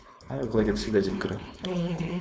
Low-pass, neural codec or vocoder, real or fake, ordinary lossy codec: none; codec, 16 kHz, 4.8 kbps, FACodec; fake; none